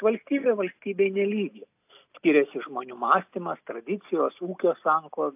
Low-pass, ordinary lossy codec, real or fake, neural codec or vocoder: 3.6 kHz; AAC, 32 kbps; real; none